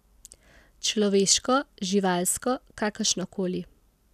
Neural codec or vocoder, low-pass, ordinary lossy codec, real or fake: none; 14.4 kHz; none; real